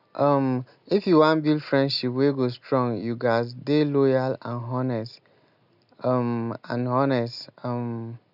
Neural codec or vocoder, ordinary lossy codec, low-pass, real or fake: none; none; 5.4 kHz; real